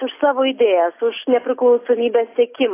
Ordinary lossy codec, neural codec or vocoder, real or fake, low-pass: AAC, 24 kbps; none; real; 3.6 kHz